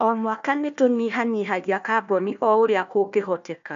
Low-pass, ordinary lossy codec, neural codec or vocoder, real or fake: 7.2 kHz; AAC, 96 kbps; codec, 16 kHz, 1 kbps, FunCodec, trained on Chinese and English, 50 frames a second; fake